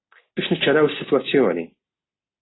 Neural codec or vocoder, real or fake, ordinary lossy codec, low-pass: none; real; AAC, 16 kbps; 7.2 kHz